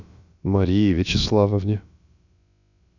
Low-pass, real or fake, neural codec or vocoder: 7.2 kHz; fake; codec, 16 kHz, about 1 kbps, DyCAST, with the encoder's durations